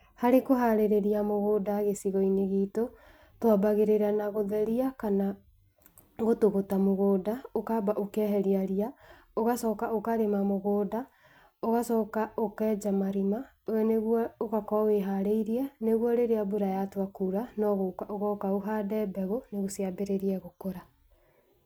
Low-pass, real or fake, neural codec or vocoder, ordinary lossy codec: none; real; none; none